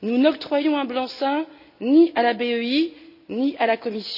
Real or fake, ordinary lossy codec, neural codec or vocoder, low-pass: real; none; none; 5.4 kHz